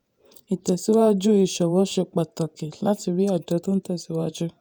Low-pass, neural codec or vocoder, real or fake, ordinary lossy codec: none; vocoder, 48 kHz, 128 mel bands, Vocos; fake; none